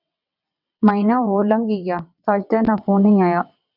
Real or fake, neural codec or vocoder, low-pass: fake; vocoder, 22.05 kHz, 80 mel bands, WaveNeXt; 5.4 kHz